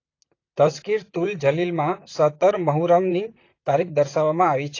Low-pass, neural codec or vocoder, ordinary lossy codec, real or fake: 7.2 kHz; vocoder, 44.1 kHz, 128 mel bands, Pupu-Vocoder; AAC, 32 kbps; fake